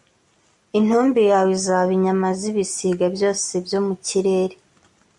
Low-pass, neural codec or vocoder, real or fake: 10.8 kHz; none; real